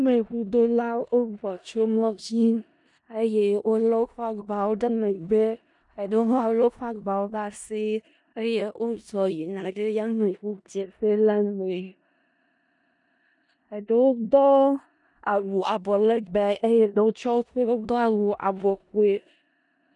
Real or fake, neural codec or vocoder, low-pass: fake; codec, 16 kHz in and 24 kHz out, 0.4 kbps, LongCat-Audio-Codec, four codebook decoder; 10.8 kHz